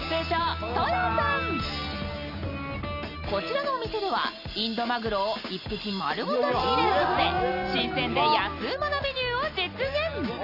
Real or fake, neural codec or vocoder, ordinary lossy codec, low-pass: real; none; Opus, 64 kbps; 5.4 kHz